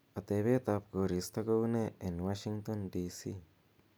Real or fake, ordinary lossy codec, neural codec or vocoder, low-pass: real; none; none; none